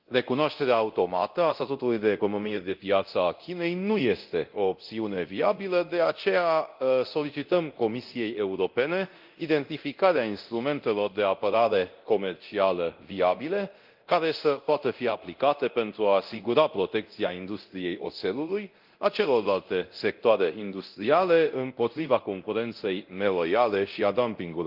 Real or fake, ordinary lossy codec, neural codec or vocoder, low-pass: fake; Opus, 32 kbps; codec, 24 kHz, 0.9 kbps, DualCodec; 5.4 kHz